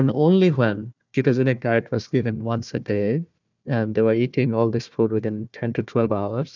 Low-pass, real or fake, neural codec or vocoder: 7.2 kHz; fake; codec, 16 kHz, 1 kbps, FunCodec, trained on Chinese and English, 50 frames a second